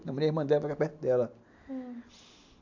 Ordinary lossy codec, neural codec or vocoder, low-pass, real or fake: MP3, 64 kbps; none; 7.2 kHz; real